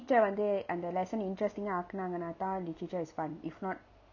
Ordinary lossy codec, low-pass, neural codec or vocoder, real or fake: none; 7.2 kHz; none; real